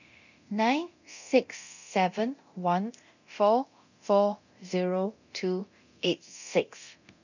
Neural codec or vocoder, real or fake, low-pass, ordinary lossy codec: codec, 24 kHz, 0.9 kbps, DualCodec; fake; 7.2 kHz; none